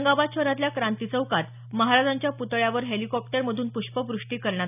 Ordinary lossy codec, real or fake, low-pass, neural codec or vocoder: none; real; 3.6 kHz; none